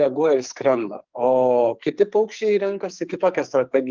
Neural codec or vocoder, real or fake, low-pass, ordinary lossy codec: codec, 44.1 kHz, 2.6 kbps, SNAC; fake; 7.2 kHz; Opus, 32 kbps